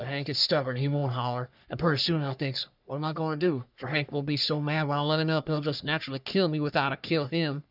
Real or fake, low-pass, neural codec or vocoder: fake; 5.4 kHz; codec, 44.1 kHz, 3.4 kbps, Pupu-Codec